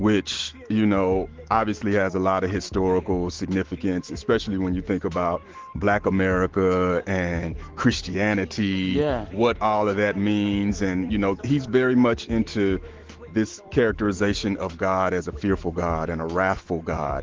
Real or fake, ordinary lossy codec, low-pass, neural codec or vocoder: fake; Opus, 16 kbps; 7.2 kHz; autoencoder, 48 kHz, 128 numbers a frame, DAC-VAE, trained on Japanese speech